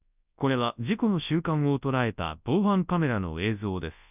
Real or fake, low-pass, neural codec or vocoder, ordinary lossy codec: fake; 3.6 kHz; codec, 24 kHz, 0.9 kbps, WavTokenizer, large speech release; none